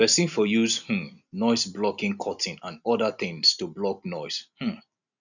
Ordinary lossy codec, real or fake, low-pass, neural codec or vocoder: none; real; 7.2 kHz; none